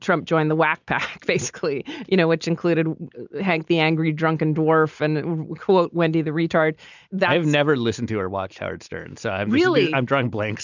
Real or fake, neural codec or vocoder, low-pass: real; none; 7.2 kHz